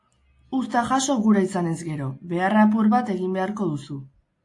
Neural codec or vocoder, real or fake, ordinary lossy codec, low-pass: none; real; AAC, 48 kbps; 10.8 kHz